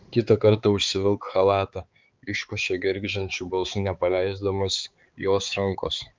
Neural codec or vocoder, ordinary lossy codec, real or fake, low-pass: codec, 16 kHz, 4 kbps, X-Codec, HuBERT features, trained on balanced general audio; Opus, 24 kbps; fake; 7.2 kHz